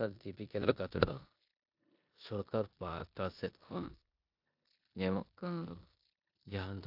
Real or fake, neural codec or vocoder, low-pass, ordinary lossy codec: fake; codec, 16 kHz in and 24 kHz out, 0.9 kbps, LongCat-Audio-Codec, four codebook decoder; 5.4 kHz; AAC, 48 kbps